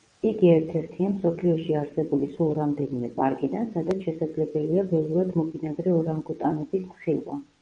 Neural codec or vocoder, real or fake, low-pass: vocoder, 22.05 kHz, 80 mel bands, WaveNeXt; fake; 9.9 kHz